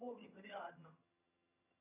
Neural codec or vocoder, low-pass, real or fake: vocoder, 22.05 kHz, 80 mel bands, HiFi-GAN; 3.6 kHz; fake